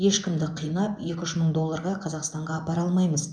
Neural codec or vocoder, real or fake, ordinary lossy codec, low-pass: vocoder, 24 kHz, 100 mel bands, Vocos; fake; none; 9.9 kHz